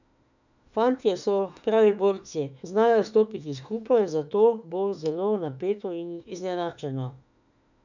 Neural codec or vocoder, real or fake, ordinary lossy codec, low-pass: autoencoder, 48 kHz, 32 numbers a frame, DAC-VAE, trained on Japanese speech; fake; none; 7.2 kHz